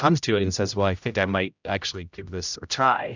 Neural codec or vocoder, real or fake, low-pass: codec, 16 kHz, 0.5 kbps, X-Codec, HuBERT features, trained on general audio; fake; 7.2 kHz